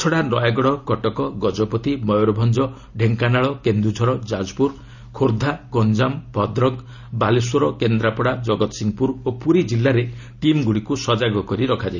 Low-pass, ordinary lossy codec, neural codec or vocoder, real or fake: 7.2 kHz; none; none; real